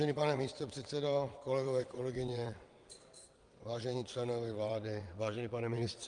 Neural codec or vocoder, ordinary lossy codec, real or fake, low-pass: vocoder, 22.05 kHz, 80 mel bands, WaveNeXt; Opus, 32 kbps; fake; 9.9 kHz